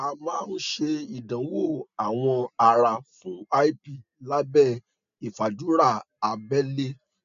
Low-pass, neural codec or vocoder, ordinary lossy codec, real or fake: 7.2 kHz; none; none; real